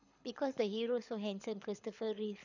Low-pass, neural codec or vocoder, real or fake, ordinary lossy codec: 7.2 kHz; codec, 24 kHz, 6 kbps, HILCodec; fake; none